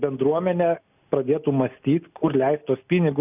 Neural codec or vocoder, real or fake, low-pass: none; real; 3.6 kHz